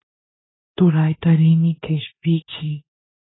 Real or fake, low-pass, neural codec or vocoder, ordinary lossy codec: fake; 7.2 kHz; codec, 16 kHz in and 24 kHz out, 0.9 kbps, LongCat-Audio-Codec, four codebook decoder; AAC, 16 kbps